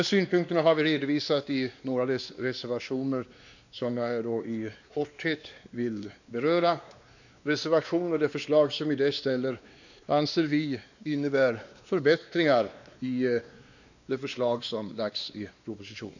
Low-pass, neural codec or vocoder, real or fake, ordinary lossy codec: 7.2 kHz; codec, 16 kHz, 2 kbps, X-Codec, WavLM features, trained on Multilingual LibriSpeech; fake; none